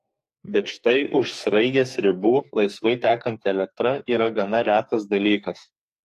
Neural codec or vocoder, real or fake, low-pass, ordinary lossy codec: codec, 44.1 kHz, 2.6 kbps, SNAC; fake; 14.4 kHz; AAC, 64 kbps